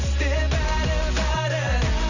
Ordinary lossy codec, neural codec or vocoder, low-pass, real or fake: none; none; 7.2 kHz; real